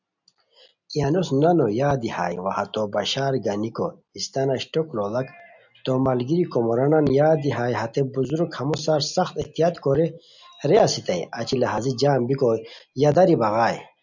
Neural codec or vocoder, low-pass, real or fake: none; 7.2 kHz; real